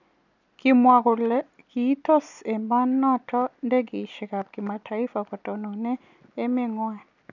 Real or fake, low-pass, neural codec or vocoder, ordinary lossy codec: real; 7.2 kHz; none; none